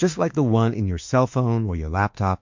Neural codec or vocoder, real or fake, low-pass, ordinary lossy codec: autoencoder, 48 kHz, 32 numbers a frame, DAC-VAE, trained on Japanese speech; fake; 7.2 kHz; MP3, 48 kbps